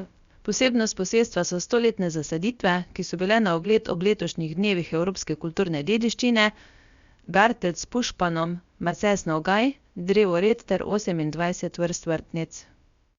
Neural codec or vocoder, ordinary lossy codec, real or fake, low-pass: codec, 16 kHz, about 1 kbps, DyCAST, with the encoder's durations; Opus, 64 kbps; fake; 7.2 kHz